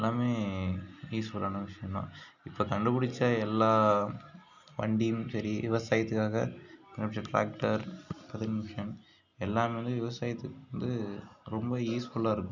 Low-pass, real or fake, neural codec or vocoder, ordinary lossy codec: 7.2 kHz; real; none; none